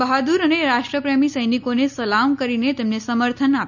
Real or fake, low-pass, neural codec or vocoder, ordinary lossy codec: real; 7.2 kHz; none; none